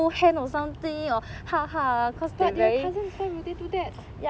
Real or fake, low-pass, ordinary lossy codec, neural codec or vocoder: real; none; none; none